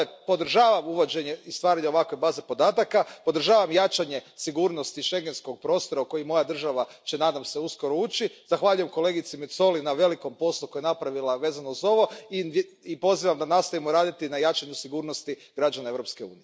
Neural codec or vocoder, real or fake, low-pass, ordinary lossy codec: none; real; none; none